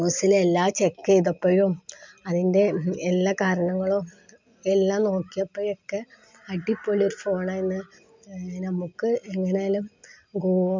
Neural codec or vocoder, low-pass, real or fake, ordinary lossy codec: none; 7.2 kHz; real; MP3, 64 kbps